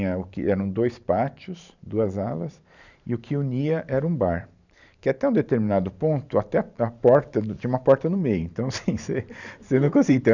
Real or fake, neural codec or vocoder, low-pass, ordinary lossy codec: fake; vocoder, 44.1 kHz, 128 mel bands every 512 samples, BigVGAN v2; 7.2 kHz; none